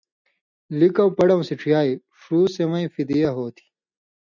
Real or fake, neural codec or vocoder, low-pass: real; none; 7.2 kHz